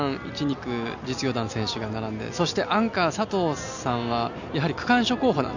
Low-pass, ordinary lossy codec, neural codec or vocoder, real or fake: 7.2 kHz; none; none; real